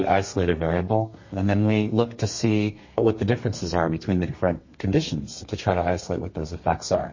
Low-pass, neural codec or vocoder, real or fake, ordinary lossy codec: 7.2 kHz; codec, 32 kHz, 1.9 kbps, SNAC; fake; MP3, 32 kbps